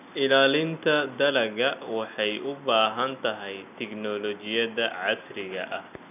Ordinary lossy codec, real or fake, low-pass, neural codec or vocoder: none; real; 3.6 kHz; none